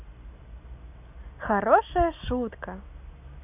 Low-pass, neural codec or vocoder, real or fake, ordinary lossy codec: 3.6 kHz; none; real; none